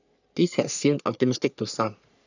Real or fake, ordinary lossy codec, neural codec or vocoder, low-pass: fake; none; codec, 44.1 kHz, 3.4 kbps, Pupu-Codec; 7.2 kHz